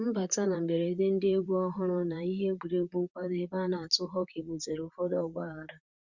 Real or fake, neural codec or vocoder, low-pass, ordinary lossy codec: fake; vocoder, 44.1 kHz, 128 mel bands, Pupu-Vocoder; 7.2 kHz; Opus, 64 kbps